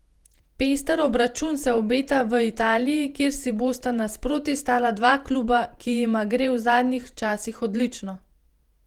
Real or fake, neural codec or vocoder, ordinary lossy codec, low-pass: fake; vocoder, 48 kHz, 128 mel bands, Vocos; Opus, 24 kbps; 19.8 kHz